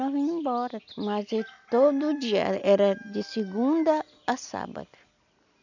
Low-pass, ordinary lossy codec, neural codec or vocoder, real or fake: 7.2 kHz; none; none; real